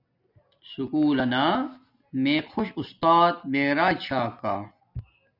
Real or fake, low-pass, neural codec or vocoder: real; 5.4 kHz; none